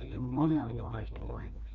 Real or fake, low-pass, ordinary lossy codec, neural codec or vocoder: fake; 7.2 kHz; MP3, 96 kbps; codec, 16 kHz, 2 kbps, FreqCodec, larger model